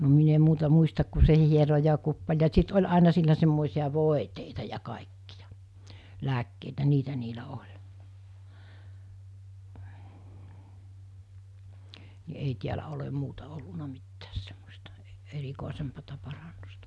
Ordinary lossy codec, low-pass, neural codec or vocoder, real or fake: none; none; none; real